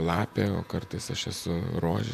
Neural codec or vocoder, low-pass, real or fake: vocoder, 48 kHz, 128 mel bands, Vocos; 14.4 kHz; fake